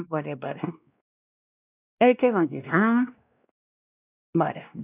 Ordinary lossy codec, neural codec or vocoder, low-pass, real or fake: none; codec, 16 kHz, 1 kbps, X-Codec, WavLM features, trained on Multilingual LibriSpeech; 3.6 kHz; fake